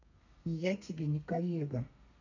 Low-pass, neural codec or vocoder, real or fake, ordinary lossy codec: 7.2 kHz; codec, 32 kHz, 1.9 kbps, SNAC; fake; none